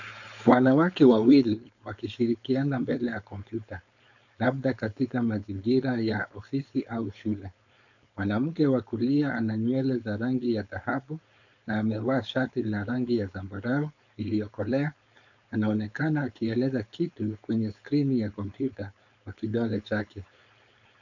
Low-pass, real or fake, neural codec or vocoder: 7.2 kHz; fake; codec, 16 kHz, 4.8 kbps, FACodec